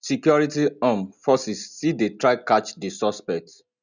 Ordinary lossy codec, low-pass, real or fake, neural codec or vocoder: none; 7.2 kHz; real; none